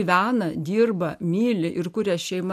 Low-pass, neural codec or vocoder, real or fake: 14.4 kHz; none; real